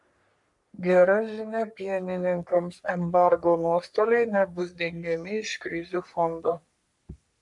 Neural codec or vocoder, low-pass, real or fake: codec, 44.1 kHz, 3.4 kbps, Pupu-Codec; 10.8 kHz; fake